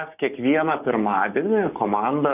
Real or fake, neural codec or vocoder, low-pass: fake; codec, 16 kHz, 6 kbps, DAC; 3.6 kHz